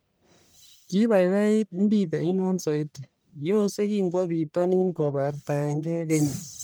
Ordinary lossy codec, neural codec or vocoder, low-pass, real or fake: none; codec, 44.1 kHz, 1.7 kbps, Pupu-Codec; none; fake